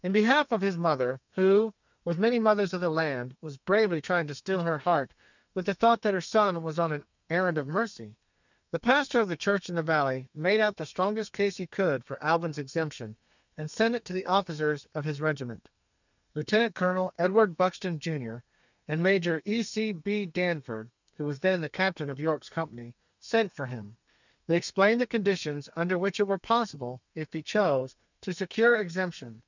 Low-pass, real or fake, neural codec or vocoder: 7.2 kHz; fake; codec, 32 kHz, 1.9 kbps, SNAC